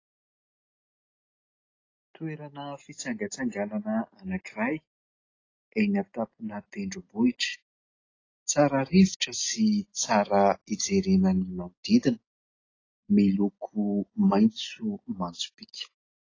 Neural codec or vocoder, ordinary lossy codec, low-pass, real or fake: none; AAC, 32 kbps; 7.2 kHz; real